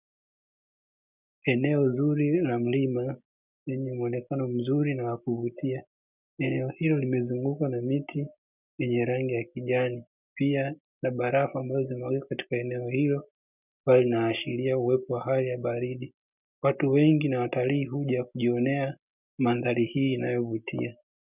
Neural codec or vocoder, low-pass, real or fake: none; 3.6 kHz; real